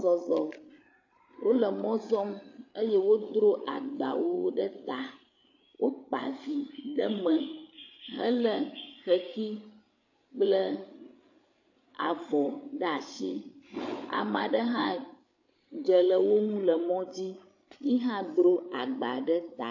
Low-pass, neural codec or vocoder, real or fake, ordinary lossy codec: 7.2 kHz; codec, 16 kHz, 8 kbps, FreqCodec, larger model; fake; AAC, 48 kbps